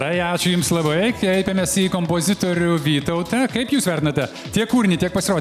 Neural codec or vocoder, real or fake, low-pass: none; real; 14.4 kHz